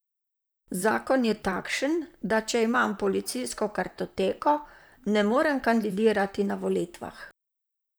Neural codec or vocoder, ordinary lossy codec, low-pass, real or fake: vocoder, 44.1 kHz, 128 mel bands, Pupu-Vocoder; none; none; fake